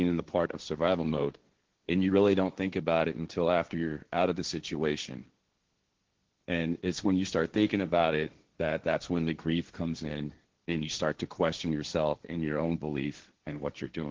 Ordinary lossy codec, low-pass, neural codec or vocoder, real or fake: Opus, 16 kbps; 7.2 kHz; codec, 16 kHz, 1.1 kbps, Voila-Tokenizer; fake